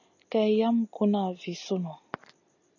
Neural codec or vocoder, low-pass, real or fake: none; 7.2 kHz; real